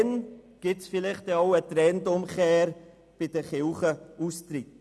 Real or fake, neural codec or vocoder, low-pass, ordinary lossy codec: real; none; none; none